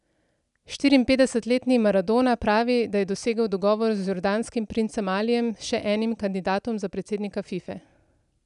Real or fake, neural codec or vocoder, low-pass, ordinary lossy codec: real; none; 10.8 kHz; none